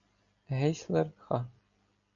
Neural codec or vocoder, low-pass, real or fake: none; 7.2 kHz; real